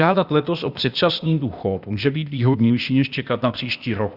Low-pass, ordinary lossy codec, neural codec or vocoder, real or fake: 5.4 kHz; Opus, 64 kbps; codec, 16 kHz, 0.8 kbps, ZipCodec; fake